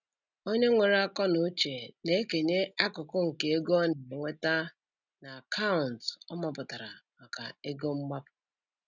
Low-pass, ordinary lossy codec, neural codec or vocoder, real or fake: 7.2 kHz; none; none; real